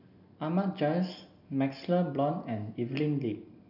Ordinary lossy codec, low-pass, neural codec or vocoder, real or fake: none; 5.4 kHz; none; real